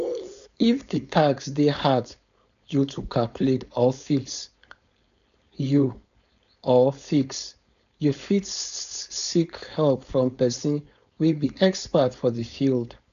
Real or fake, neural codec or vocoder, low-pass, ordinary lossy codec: fake; codec, 16 kHz, 4.8 kbps, FACodec; 7.2 kHz; AAC, 96 kbps